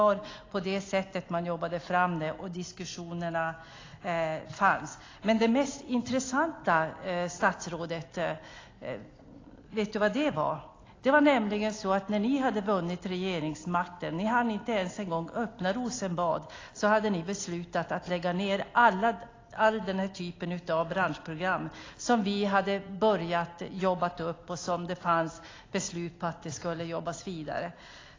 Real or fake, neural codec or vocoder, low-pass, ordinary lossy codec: real; none; 7.2 kHz; AAC, 32 kbps